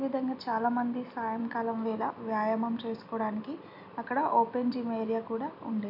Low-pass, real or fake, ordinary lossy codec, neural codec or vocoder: 5.4 kHz; real; none; none